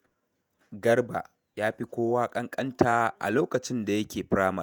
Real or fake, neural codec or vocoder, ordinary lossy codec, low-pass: fake; vocoder, 48 kHz, 128 mel bands, Vocos; none; none